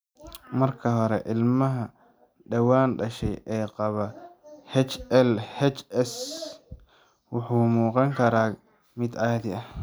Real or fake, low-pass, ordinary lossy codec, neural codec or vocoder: real; none; none; none